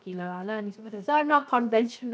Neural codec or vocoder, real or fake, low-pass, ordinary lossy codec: codec, 16 kHz, 0.7 kbps, FocalCodec; fake; none; none